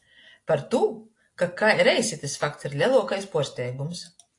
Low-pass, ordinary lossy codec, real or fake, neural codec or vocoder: 10.8 kHz; AAC, 48 kbps; real; none